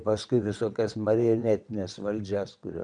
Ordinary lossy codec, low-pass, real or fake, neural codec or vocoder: MP3, 96 kbps; 9.9 kHz; fake; vocoder, 22.05 kHz, 80 mel bands, WaveNeXt